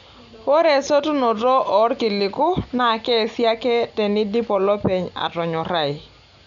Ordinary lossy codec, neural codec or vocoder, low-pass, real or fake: none; none; 7.2 kHz; real